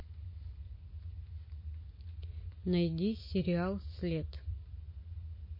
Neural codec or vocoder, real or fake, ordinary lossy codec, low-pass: none; real; MP3, 24 kbps; 5.4 kHz